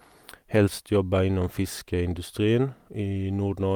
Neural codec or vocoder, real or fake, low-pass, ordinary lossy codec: none; real; 19.8 kHz; Opus, 32 kbps